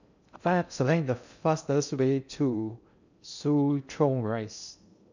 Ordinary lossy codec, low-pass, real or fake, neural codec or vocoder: none; 7.2 kHz; fake; codec, 16 kHz in and 24 kHz out, 0.6 kbps, FocalCodec, streaming, 2048 codes